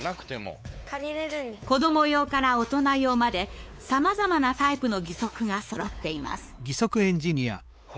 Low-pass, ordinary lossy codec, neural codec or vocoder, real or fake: none; none; codec, 16 kHz, 4 kbps, X-Codec, WavLM features, trained on Multilingual LibriSpeech; fake